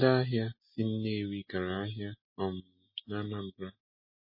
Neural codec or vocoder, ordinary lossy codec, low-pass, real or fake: none; MP3, 24 kbps; 5.4 kHz; real